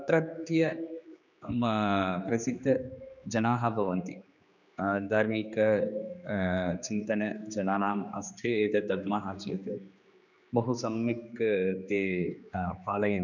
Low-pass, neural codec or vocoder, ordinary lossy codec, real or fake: 7.2 kHz; codec, 16 kHz, 2 kbps, X-Codec, HuBERT features, trained on general audio; none; fake